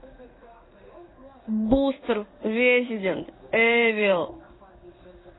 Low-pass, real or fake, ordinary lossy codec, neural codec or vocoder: 7.2 kHz; fake; AAC, 16 kbps; codec, 16 kHz in and 24 kHz out, 1 kbps, XY-Tokenizer